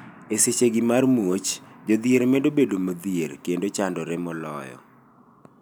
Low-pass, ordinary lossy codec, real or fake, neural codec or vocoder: none; none; real; none